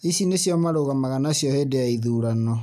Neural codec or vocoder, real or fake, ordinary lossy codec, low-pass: none; real; none; 14.4 kHz